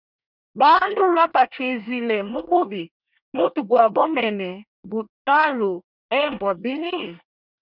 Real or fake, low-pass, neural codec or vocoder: fake; 5.4 kHz; codec, 24 kHz, 1 kbps, SNAC